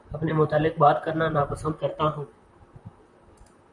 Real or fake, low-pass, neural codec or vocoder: fake; 10.8 kHz; vocoder, 44.1 kHz, 128 mel bands, Pupu-Vocoder